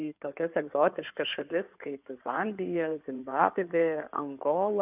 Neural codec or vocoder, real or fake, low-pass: codec, 16 kHz in and 24 kHz out, 2.2 kbps, FireRedTTS-2 codec; fake; 3.6 kHz